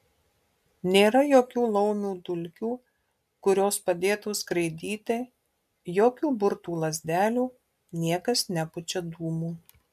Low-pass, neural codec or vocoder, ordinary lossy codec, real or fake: 14.4 kHz; none; MP3, 96 kbps; real